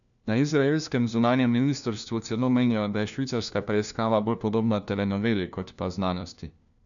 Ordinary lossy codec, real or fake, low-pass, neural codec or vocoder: none; fake; 7.2 kHz; codec, 16 kHz, 1 kbps, FunCodec, trained on LibriTTS, 50 frames a second